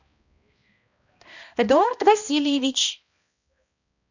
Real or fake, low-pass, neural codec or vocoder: fake; 7.2 kHz; codec, 16 kHz, 1 kbps, X-Codec, HuBERT features, trained on balanced general audio